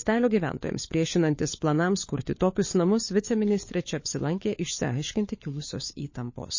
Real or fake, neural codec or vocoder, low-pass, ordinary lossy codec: fake; codec, 16 kHz, 4 kbps, FunCodec, trained on LibriTTS, 50 frames a second; 7.2 kHz; MP3, 32 kbps